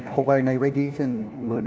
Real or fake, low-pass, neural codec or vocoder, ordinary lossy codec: fake; none; codec, 16 kHz, 1 kbps, FunCodec, trained on LibriTTS, 50 frames a second; none